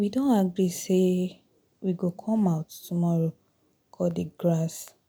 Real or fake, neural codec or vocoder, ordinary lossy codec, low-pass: real; none; none; none